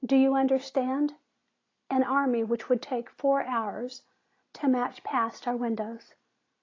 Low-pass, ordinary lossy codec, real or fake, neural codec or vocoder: 7.2 kHz; AAC, 32 kbps; real; none